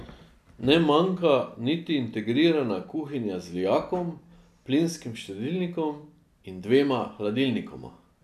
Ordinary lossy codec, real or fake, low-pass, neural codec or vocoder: none; real; 14.4 kHz; none